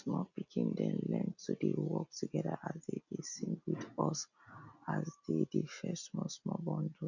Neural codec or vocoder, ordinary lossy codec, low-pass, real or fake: none; none; 7.2 kHz; real